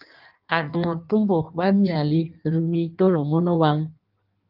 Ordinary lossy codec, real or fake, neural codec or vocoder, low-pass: Opus, 32 kbps; fake; codec, 16 kHz in and 24 kHz out, 1.1 kbps, FireRedTTS-2 codec; 5.4 kHz